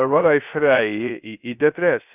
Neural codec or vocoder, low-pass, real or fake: codec, 16 kHz, 0.3 kbps, FocalCodec; 3.6 kHz; fake